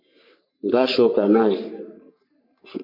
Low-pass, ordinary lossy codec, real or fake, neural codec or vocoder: 5.4 kHz; MP3, 48 kbps; fake; codec, 44.1 kHz, 3.4 kbps, Pupu-Codec